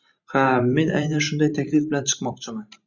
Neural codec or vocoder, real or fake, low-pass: vocoder, 44.1 kHz, 128 mel bands every 512 samples, BigVGAN v2; fake; 7.2 kHz